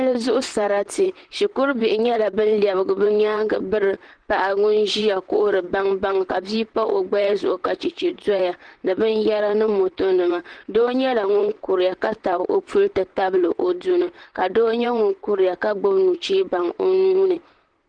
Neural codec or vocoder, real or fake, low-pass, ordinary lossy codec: vocoder, 44.1 kHz, 128 mel bands, Pupu-Vocoder; fake; 9.9 kHz; Opus, 16 kbps